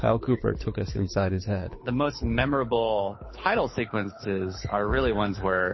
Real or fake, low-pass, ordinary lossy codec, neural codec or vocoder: fake; 7.2 kHz; MP3, 24 kbps; codec, 24 kHz, 6 kbps, HILCodec